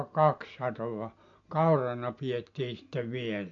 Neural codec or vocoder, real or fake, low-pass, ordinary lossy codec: none; real; 7.2 kHz; AAC, 48 kbps